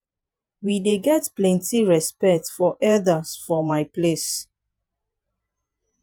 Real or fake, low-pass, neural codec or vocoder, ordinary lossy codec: fake; none; vocoder, 48 kHz, 128 mel bands, Vocos; none